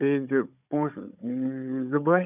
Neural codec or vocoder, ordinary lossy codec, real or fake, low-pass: codec, 16 kHz, 16 kbps, FunCodec, trained on Chinese and English, 50 frames a second; none; fake; 3.6 kHz